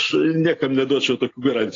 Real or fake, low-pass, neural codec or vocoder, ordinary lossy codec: real; 7.2 kHz; none; AAC, 32 kbps